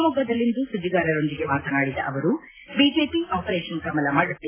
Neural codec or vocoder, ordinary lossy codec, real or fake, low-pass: none; AAC, 16 kbps; real; 3.6 kHz